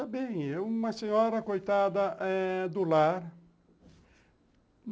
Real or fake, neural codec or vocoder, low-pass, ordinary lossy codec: real; none; none; none